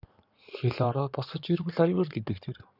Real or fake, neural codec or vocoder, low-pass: fake; codec, 16 kHz in and 24 kHz out, 2.2 kbps, FireRedTTS-2 codec; 5.4 kHz